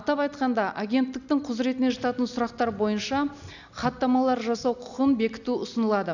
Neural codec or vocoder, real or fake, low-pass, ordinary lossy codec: none; real; 7.2 kHz; none